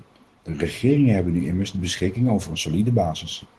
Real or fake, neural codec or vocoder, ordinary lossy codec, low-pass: real; none; Opus, 16 kbps; 10.8 kHz